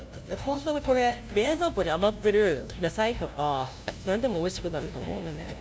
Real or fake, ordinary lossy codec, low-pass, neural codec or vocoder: fake; none; none; codec, 16 kHz, 0.5 kbps, FunCodec, trained on LibriTTS, 25 frames a second